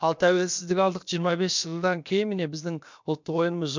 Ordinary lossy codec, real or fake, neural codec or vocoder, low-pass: MP3, 64 kbps; fake; codec, 16 kHz, about 1 kbps, DyCAST, with the encoder's durations; 7.2 kHz